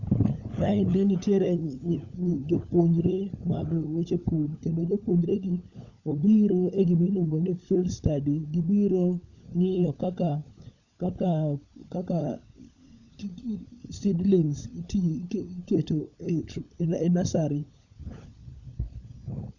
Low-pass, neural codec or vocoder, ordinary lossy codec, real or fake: 7.2 kHz; codec, 16 kHz, 16 kbps, FunCodec, trained on LibriTTS, 50 frames a second; none; fake